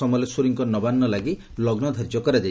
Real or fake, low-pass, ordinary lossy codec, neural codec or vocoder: real; none; none; none